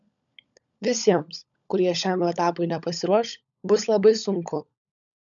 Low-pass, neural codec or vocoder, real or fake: 7.2 kHz; codec, 16 kHz, 16 kbps, FunCodec, trained on LibriTTS, 50 frames a second; fake